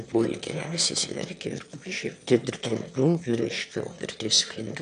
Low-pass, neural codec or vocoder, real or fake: 9.9 kHz; autoencoder, 22.05 kHz, a latent of 192 numbers a frame, VITS, trained on one speaker; fake